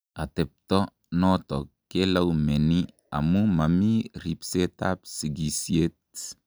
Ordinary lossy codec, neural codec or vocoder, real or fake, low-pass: none; none; real; none